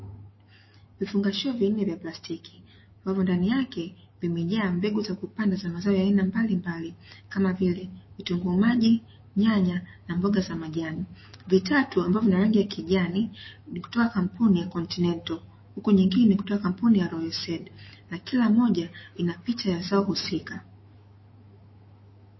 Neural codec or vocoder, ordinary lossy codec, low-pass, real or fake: autoencoder, 48 kHz, 128 numbers a frame, DAC-VAE, trained on Japanese speech; MP3, 24 kbps; 7.2 kHz; fake